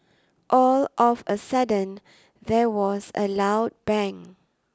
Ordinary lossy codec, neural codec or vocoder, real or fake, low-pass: none; none; real; none